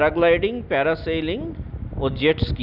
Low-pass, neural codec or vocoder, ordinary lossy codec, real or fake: 5.4 kHz; none; none; real